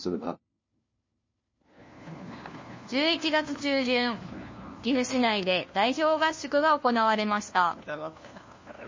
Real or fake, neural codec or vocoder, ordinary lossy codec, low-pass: fake; codec, 16 kHz, 1 kbps, FunCodec, trained on LibriTTS, 50 frames a second; MP3, 32 kbps; 7.2 kHz